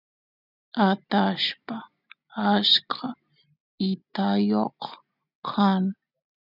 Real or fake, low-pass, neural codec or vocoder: real; 5.4 kHz; none